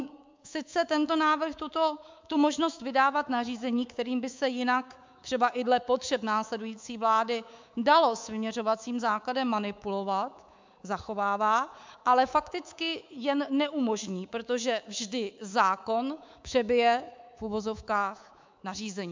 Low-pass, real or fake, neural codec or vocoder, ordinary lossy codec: 7.2 kHz; fake; codec, 24 kHz, 3.1 kbps, DualCodec; MP3, 64 kbps